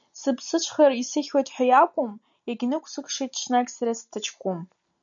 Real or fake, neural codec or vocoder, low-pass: real; none; 7.2 kHz